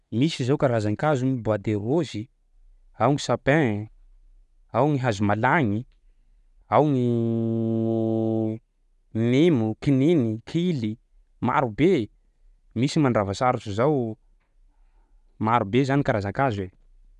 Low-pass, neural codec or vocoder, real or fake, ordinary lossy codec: 10.8 kHz; none; real; none